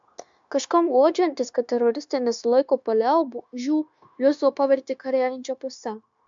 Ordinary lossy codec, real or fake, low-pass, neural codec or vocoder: MP3, 64 kbps; fake; 7.2 kHz; codec, 16 kHz, 0.9 kbps, LongCat-Audio-Codec